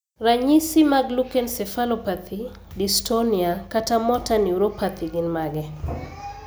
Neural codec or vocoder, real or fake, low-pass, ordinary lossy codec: none; real; none; none